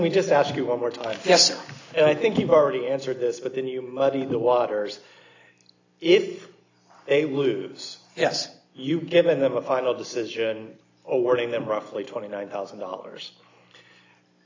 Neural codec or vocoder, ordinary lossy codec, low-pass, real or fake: none; AAC, 48 kbps; 7.2 kHz; real